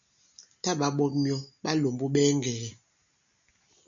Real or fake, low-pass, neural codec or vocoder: real; 7.2 kHz; none